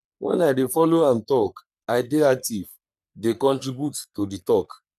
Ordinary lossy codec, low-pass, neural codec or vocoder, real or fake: none; 14.4 kHz; codec, 44.1 kHz, 3.4 kbps, Pupu-Codec; fake